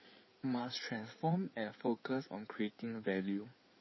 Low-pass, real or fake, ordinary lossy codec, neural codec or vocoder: 7.2 kHz; fake; MP3, 24 kbps; codec, 16 kHz in and 24 kHz out, 2.2 kbps, FireRedTTS-2 codec